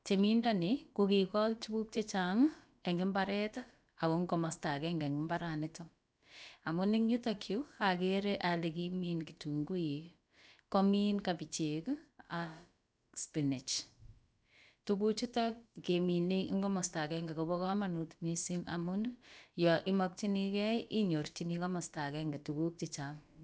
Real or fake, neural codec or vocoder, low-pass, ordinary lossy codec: fake; codec, 16 kHz, about 1 kbps, DyCAST, with the encoder's durations; none; none